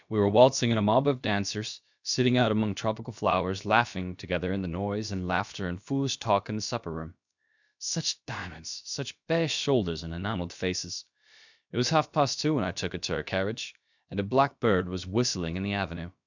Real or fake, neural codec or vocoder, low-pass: fake; codec, 16 kHz, about 1 kbps, DyCAST, with the encoder's durations; 7.2 kHz